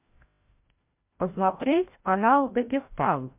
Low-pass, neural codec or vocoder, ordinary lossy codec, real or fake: 3.6 kHz; codec, 16 kHz, 0.5 kbps, FreqCodec, larger model; MP3, 32 kbps; fake